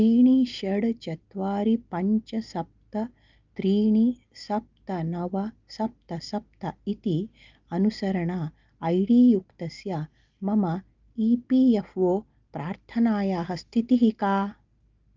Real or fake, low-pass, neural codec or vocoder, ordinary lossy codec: real; 7.2 kHz; none; Opus, 24 kbps